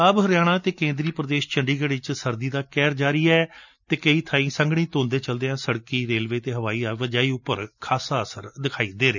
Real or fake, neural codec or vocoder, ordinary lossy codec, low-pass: real; none; none; 7.2 kHz